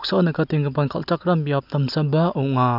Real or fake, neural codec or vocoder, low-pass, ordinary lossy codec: real; none; 5.4 kHz; none